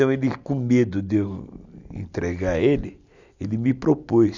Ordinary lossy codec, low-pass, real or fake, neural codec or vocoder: none; 7.2 kHz; real; none